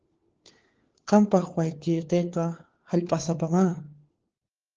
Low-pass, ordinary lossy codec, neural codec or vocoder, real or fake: 7.2 kHz; Opus, 16 kbps; codec, 16 kHz, 4 kbps, FunCodec, trained on LibriTTS, 50 frames a second; fake